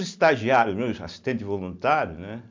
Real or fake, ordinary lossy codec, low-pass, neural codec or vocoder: real; MP3, 64 kbps; 7.2 kHz; none